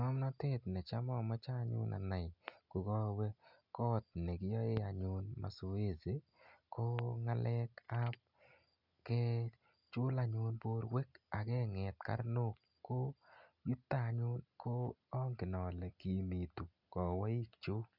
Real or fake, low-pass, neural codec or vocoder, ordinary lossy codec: real; 5.4 kHz; none; none